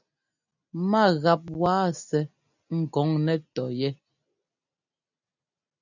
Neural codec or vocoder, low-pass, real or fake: none; 7.2 kHz; real